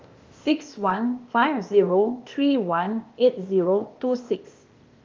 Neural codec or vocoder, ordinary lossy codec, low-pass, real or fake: codec, 16 kHz, 0.8 kbps, ZipCodec; Opus, 32 kbps; 7.2 kHz; fake